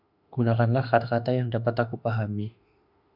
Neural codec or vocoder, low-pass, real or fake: autoencoder, 48 kHz, 32 numbers a frame, DAC-VAE, trained on Japanese speech; 5.4 kHz; fake